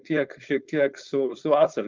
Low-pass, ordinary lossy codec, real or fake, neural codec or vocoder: 7.2 kHz; Opus, 24 kbps; fake; codec, 16 kHz, 4.8 kbps, FACodec